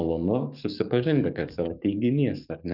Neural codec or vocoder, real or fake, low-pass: codec, 44.1 kHz, 7.8 kbps, DAC; fake; 5.4 kHz